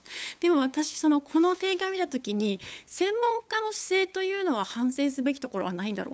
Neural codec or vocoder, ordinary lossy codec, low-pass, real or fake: codec, 16 kHz, 8 kbps, FunCodec, trained on LibriTTS, 25 frames a second; none; none; fake